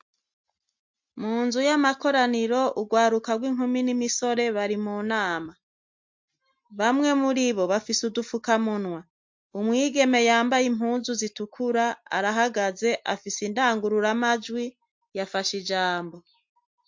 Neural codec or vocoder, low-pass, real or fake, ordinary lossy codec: none; 7.2 kHz; real; MP3, 48 kbps